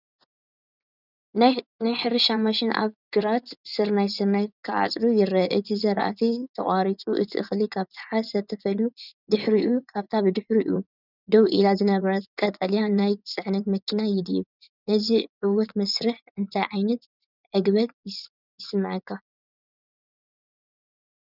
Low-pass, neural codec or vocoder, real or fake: 5.4 kHz; vocoder, 24 kHz, 100 mel bands, Vocos; fake